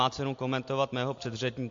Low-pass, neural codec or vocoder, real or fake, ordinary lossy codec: 7.2 kHz; none; real; MP3, 48 kbps